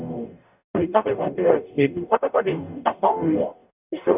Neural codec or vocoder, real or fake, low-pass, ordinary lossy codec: codec, 44.1 kHz, 0.9 kbps, DAC; fake; 3.6 kHz; none